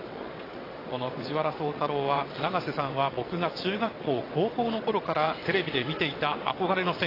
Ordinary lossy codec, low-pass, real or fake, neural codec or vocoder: AAC, 24 kbps; 5.4 kHz; fake; vocoder, 22.05 kHz, 80 mel bands, WaveNeXt